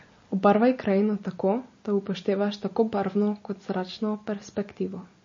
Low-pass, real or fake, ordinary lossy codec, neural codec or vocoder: 7.2 kHz; real; MP3, 32 kbps; none